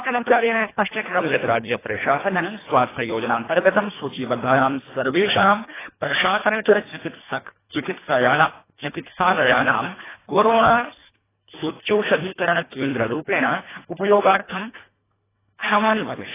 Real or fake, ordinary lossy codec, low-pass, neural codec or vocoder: fake; AAC, 16 kbps; 3.6 kHz; codec, 24 kHz, 1.5 kbps, HILCodec